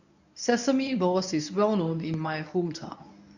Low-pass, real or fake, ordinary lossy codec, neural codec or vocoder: 7.2 kHz; fake; none; codec, 24 kHz, 0.9 kbps, WavTokenizer, medium speech release version 1